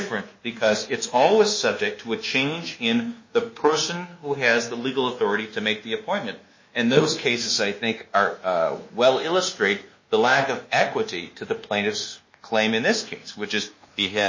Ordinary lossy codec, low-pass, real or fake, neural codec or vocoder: MP3, 32 kbps; 7.2 kHz; fake; codec, 24 kHz, 1.2 kbps, DualCodec